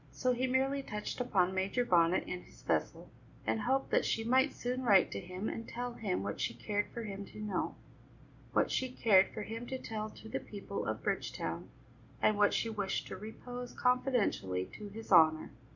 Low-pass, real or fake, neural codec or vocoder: 7.2 kHz; real; none